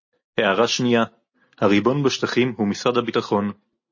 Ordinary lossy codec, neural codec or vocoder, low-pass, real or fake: MP3, 32 kbps; none; 7.2 kHz; real